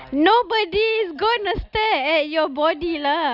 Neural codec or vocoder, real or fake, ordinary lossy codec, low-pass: none; real; none; 5.4 kHz